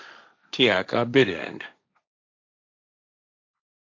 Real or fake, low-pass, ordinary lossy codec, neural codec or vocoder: fake; 7.2 kHz; AAC, 48 kbps; codec, 16 kHz, 1.1 kbps, Voila-Tokenizer